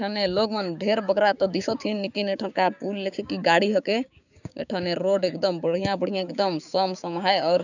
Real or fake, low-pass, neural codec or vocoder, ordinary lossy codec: fake; 7.2 kHz; codec, 16 kHz, 6 kbps, DAC; none